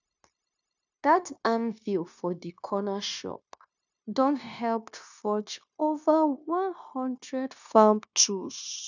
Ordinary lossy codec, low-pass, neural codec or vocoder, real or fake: none; 7.2 kHz; codec, 16 kHz, 0.9 kbps, LongCat-Audio-Codec; fake